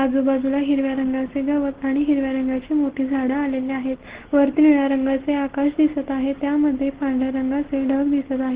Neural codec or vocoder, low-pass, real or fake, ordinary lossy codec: none; 3.6 kHz; real; Opus, 16 kbps